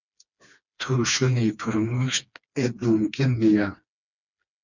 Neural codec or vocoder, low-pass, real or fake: codec, 16 kHz, 2 kbps, FreqCodec, smaller model; 7.2 kHz; fake